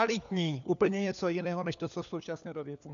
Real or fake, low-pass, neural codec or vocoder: fake; 7.2 kHz; codec, 16 kHz, 4 kbps, FunCodec, trained on LibriTTS, 50 frames a second